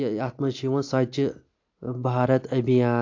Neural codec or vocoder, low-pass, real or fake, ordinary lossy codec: none; 7.2 kHz; real; AAC, 48 kbps